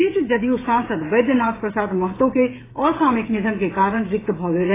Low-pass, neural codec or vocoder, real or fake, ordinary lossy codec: 3.6 kHz; codec, 16 kHz, 16 kbps, FreqCodec, smaller model; fake; AAC, 16 kbps